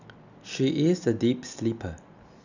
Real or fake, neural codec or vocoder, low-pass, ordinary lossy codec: real; none; 7.2 kHz; none